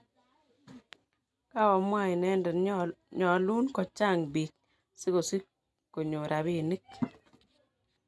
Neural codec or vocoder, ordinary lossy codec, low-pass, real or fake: none; none; none; real